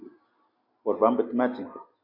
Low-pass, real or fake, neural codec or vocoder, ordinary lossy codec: 5.4 kHz; real; none; MP3, 32 kbps